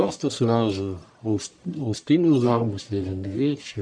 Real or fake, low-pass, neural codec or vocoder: fake; 9.9 kHz; codec, 44.1 kHz, 1.7 kbps, Pupu-Codec